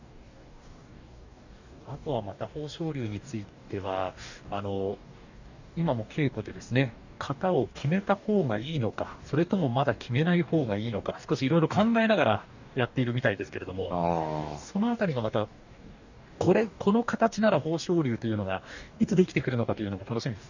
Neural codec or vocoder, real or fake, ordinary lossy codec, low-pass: codec, 44.1 kHz, 2.6 kbps, DAC; fake; none; 7.2 kHz